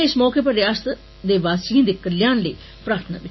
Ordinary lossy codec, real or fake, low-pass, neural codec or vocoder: MP3, 24 kbps; real; 7.2 kHz; none